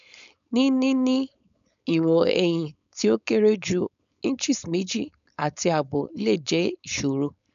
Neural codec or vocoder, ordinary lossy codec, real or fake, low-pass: codec, 16 kHz, 4.8 kbps, FACodec; none; fake; 7.2 kHz